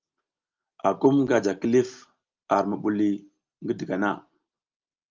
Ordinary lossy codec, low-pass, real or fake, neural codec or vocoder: Opus, 24 kbps; 7.2 kHz; real; none